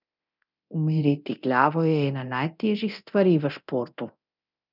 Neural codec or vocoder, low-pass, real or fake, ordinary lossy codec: codec, 24 kHz, 0.9 kbps, DualCodec; 5.4 kHz; fake; none